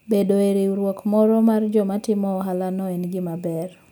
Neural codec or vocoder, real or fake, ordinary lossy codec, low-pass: none; real; none; none